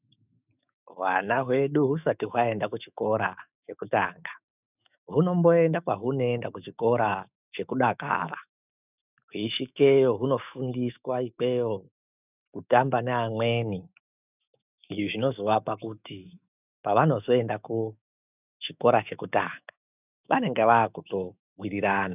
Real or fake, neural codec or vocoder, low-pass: fake; autoencoder, 48 kHz, 128 numbers a frame, DAC-VAE, trained on Japanese speech; 3.6 kHz